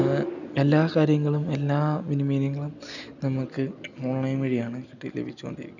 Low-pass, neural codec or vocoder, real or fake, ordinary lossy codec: 7.2 kHz; none; real; none